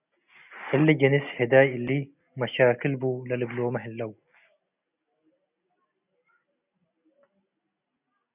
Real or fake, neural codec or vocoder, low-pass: real; none; 3.6 kHz